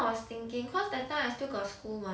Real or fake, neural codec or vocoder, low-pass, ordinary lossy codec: real; none; none; none